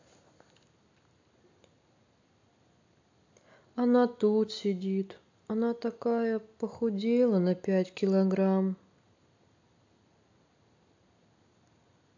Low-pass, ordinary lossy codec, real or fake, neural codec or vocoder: 7.2 kHz; AAC, 48 kbps; real; none